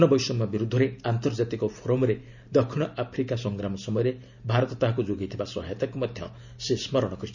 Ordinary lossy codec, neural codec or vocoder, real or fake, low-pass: none; none; real; 7.2 kHz